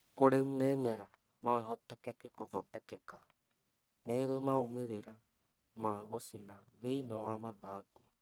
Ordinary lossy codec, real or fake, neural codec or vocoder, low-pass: none; fake; codec, 44.1 kHz, 1.7 kbps, Pupu-Codec; none